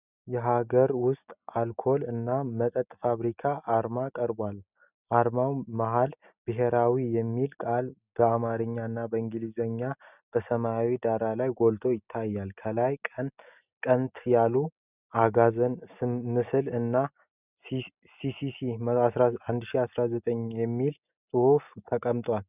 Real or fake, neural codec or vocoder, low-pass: real; none; 3.6 kHz